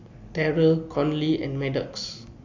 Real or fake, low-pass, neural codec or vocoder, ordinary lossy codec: real; 7.2 kHz; none; AAC, 48 kbps